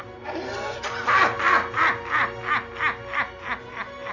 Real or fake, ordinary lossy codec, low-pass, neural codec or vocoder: real; none; 7.2 kHz; none